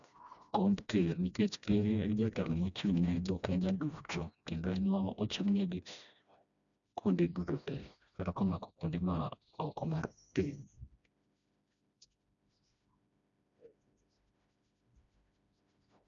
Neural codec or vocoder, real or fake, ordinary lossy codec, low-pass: codec, 16 kHz, 1 kbps, FreqCodec, smaller model; fake; none; 7.2 kHz